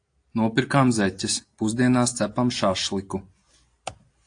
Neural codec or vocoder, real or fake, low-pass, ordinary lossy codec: none; real; 9.9 kHz; AAC, 48 kbps